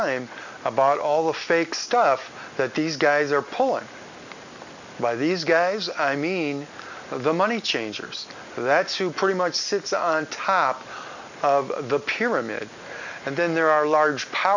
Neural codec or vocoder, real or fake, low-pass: none; real; 7.2 kHz